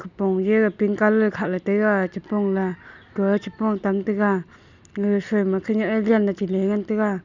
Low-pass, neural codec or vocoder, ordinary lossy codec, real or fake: 7.2 kHz; none; none; real